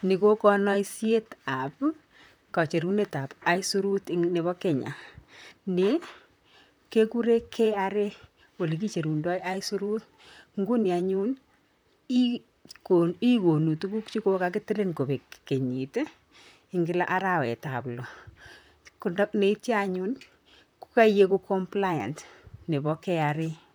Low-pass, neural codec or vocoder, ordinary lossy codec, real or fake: none; vocoder, 44.1 kHz, 128 mel bands, Pupu-Vocoder; none; fake